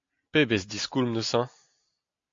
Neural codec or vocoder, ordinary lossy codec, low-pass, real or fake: none; MP3, 48 kbps; 7.2 kHz; real